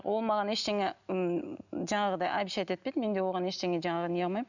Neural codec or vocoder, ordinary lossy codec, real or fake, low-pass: none; none; real; 7.2 kHz